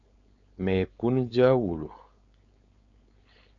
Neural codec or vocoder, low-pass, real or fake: codec, 16 kHz, 4.8 kbps, FACodec; 7.2 kHz; fake